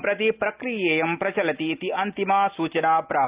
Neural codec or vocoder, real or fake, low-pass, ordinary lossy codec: none; real; 3.6 kHz; Opus, 32 kbps